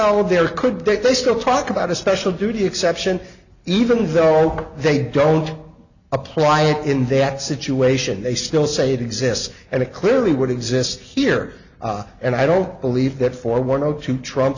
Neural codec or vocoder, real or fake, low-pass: none; real; 7.2 kHz